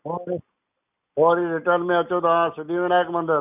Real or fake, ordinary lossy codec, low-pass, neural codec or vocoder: real; none; 3.6 kHz; none